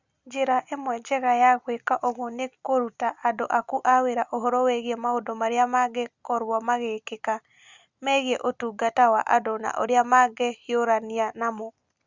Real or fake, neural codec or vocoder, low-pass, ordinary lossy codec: real; none; 7.2 kHz; Opus, 64 kbps